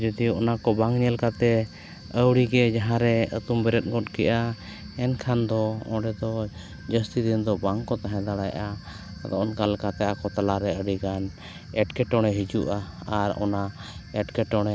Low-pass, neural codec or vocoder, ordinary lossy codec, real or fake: none; none; none; real